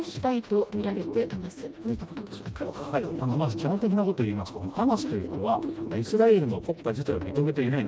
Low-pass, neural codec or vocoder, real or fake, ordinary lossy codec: none; codec, 16 kHz, 1 kbps, FreqCodec, smaller model; fake; none